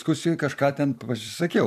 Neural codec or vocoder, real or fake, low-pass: vocoder, 44.1 kHz, 128 mel bands every 256 samples, BigVGAN v2; fake; 14.4 kHz